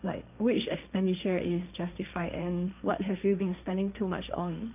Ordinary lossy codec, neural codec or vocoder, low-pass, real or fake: none; codec, 16 kHz, 1.1 kbps, Voila-Tokenizer; 3.6 kHz; fake